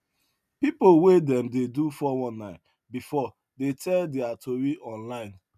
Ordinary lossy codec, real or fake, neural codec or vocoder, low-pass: none; real; none; 14.4 kHz